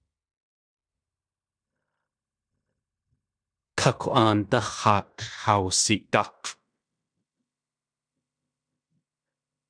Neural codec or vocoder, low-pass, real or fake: codec, 16 kHz in and 24 kHz out, 0.9 kbps, LongCat-Audio-Codec, fine tuned four codebook decoder; 9.9 kHz; fake